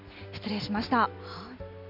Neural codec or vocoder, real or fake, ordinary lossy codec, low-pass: none; real; AAC, 48 kbps; 5.4 kHz